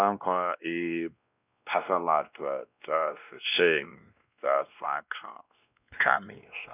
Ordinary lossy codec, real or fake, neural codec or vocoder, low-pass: none; fake; codec, 16 kHz, 1 kbps, X-Codec, WavLM features, trained on Multilingual LibriSpeech; 3.6 kHz